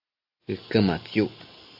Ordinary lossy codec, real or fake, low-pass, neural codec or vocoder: AAC, 48 kbps; real; 5.4 kHz; none